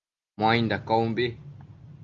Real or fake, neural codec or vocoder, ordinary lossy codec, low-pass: real; none; Opus, 32 kbps; 7.2 kHz